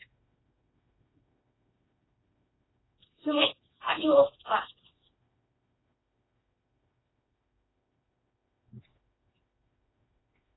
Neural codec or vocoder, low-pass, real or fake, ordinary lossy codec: codec, 16 kHz, 2 kbps, FreqCodec, smaller model; 7.2 kHz; fake; AAC, 16 kbps